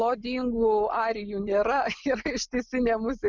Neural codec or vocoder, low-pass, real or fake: none; 7.2 kHz; real